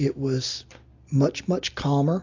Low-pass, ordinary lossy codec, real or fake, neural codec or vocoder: 7.2 kHz; AAC, 48 kbps; real; none